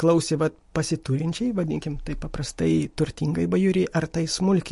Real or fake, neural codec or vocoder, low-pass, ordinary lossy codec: real; none; 14.4 kHz; MP3, 48 kbps